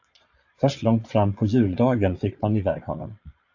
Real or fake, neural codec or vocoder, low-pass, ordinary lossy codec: fake; vocoder, 22.05 kHz, 80 mel bands, Vocos; 7.2 kHz; AAC, 48 kbps